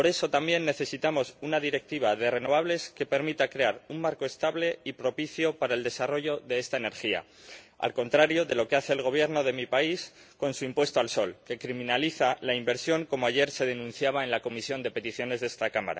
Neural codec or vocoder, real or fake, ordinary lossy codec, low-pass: none; real; none; none